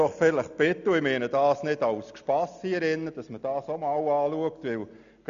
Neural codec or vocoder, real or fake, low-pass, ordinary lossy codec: none; real; 7.2 kHz; none